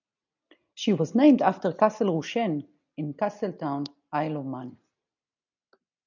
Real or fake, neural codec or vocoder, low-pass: real; none; 7.2 kHz